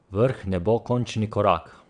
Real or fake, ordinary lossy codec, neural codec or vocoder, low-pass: fake; none; vocoder, 22.05 kHz, 80 mel bands, WaveNeXt; 9.9 kHz